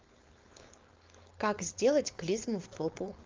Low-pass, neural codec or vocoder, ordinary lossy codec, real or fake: 7.2 kHz; codec, 16 kHz, 4.8 kbps, FACodec; Opus, 32 kbps; fake